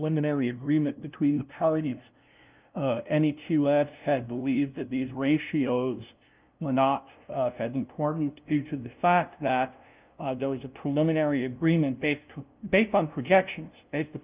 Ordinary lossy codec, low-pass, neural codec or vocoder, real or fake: Opus, 24 kbps; 3.6 kHz; codec, 16 kHz, 0.5 kbps, FunCodec, trained on LibriTTS, 25 frames a second; fake